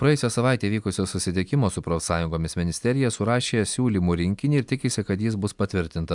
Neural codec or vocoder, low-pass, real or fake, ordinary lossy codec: none; 10.8 kHz; real; MP3, 96 kbps